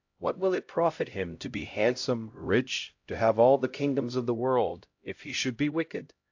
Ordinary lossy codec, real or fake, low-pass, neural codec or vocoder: AAC, 48 kbps; fake; 7.2 kHz; codec, 16 kHz, 0.5 kbps, X-Codec, HuBERT features, trained on LibriSpeech